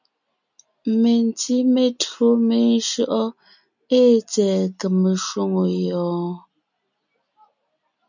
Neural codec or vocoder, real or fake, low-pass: none; real; 7.2 kHz